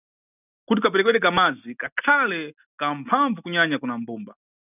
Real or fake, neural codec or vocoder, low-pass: real; none; 3.6 kHz